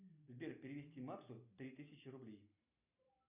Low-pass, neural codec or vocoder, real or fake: 3.6 kHz; none; real